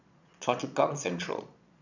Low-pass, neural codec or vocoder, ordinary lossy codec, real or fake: 7.2 kHz; vocoder, 22.05 kHz, 80 mel bands, Vocos; none; fake